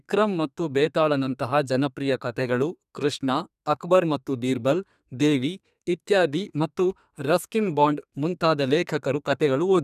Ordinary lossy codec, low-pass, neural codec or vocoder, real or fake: none; 14.4 kHz; codec, 32 kHz, 1.9 kbps, SNAC; fake